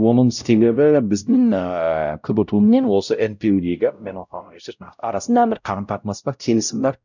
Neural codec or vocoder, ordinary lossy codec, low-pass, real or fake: codec, 16 kHz, 0.5 kbps, X-Codec, WavLM features, trained on Multilingual LibriSpeech; none; 7.2 kHz; fake